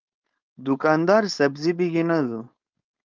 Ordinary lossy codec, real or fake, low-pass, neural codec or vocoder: Opus, 24 kbps; fake; 7.2 kHz; codec, 16 kHz, 4.8 kbps, FACodec